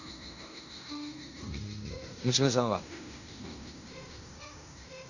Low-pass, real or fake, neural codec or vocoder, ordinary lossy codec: 7.2 kHz; fake; codec, 16 kHz in and 24 kHz out, 0.9 kbps, LongCat-Audio-Codec, fine tuned four codebook decoder; none